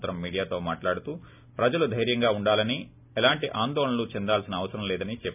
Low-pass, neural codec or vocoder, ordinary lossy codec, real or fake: 3.6 kHz; none; none; real